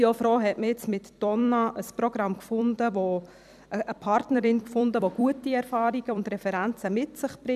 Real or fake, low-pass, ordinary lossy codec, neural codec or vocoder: fake; 14.4 kHz; none; vocoder, 44.1 kHz, 128 mel bands every 256 samples, BigVGAN v2